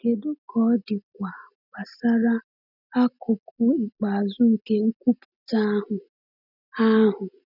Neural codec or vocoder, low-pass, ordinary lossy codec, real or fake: none; 5.4 kHz; none; real